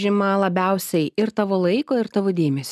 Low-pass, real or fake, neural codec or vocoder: 14.4 kHz; real; none